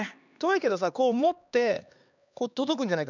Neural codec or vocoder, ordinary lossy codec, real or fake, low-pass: codec, 16 kHz, 2 kbps, X-Codec, HuBERT features, trained on LibriSpeech; none; fake; 7.2 kHz